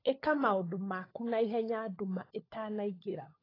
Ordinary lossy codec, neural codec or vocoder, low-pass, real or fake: AAC, 24 kbps; codec, 16 kHz, 16 kbps, FunCodec, trained on LibriTTS, 50 frames a second; 5.4 kHz; fake